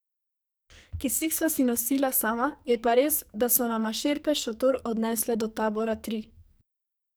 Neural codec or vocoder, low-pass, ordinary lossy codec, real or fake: codec, 44.1 kHz, 2.6 kbps, SNAC; none; none; fake